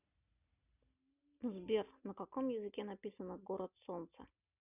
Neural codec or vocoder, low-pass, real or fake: none; 3.6 kHz; real